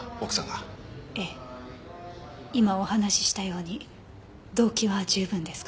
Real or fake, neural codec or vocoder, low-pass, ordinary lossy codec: real; none; none; none